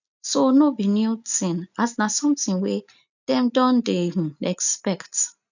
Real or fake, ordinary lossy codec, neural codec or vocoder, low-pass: real; none; none; 7.2 kHz